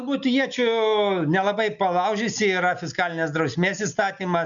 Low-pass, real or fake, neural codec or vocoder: 7.2 kHz; real; none